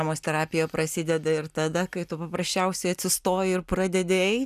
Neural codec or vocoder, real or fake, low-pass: none; real; 14.4 kHz